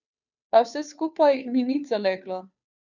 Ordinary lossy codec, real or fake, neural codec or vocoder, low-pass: none; fake; codec, 16 kHz, 2 kbps, FunCodec, trained on Chinese and English, 25 frames a second; 7.2 kHz